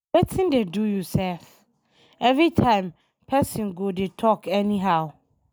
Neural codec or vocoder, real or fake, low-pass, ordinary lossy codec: none; real; none; none